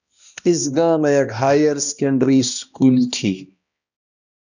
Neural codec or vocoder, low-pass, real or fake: codec, 16 kHz, 1 kbps, X-Codec, HuBERT features, trained on balanced general audio; 7.2 kHz; fake